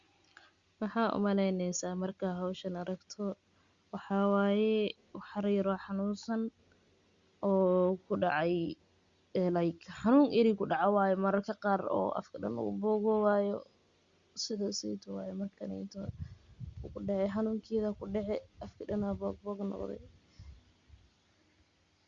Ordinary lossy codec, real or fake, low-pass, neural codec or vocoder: Opus, 64 kbps; real; 7.2 kHz; none